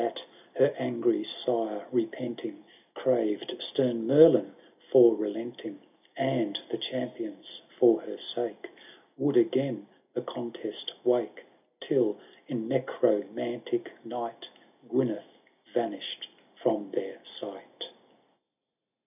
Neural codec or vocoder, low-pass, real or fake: none; 3.6 kHz; real